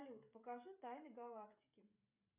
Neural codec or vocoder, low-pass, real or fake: codec, 16 kHz, 16 kbps, FreqCodec, smaller model; 3.6 kHz; fake